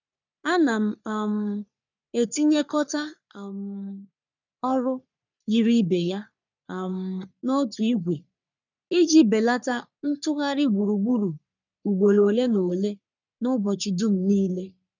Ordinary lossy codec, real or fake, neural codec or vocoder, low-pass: none; fake; codec, 44.1 kHz, 3.4 kbps, Pupu-Codec; 7.2 kHz